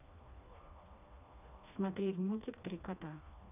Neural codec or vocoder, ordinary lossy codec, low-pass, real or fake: codec, 16 kHz, 2 kbps, FreqCodec, smaller model; none; 3.6 kHz; fake